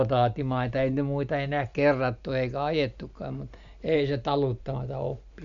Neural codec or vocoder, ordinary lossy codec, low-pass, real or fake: none; none; 7.2 kHz; real